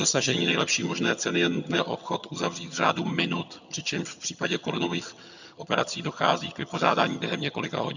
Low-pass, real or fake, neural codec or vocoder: 7.2 kHz; fake; vocoder, 22.05 kHz, 80 mel bands, HiFi-GAN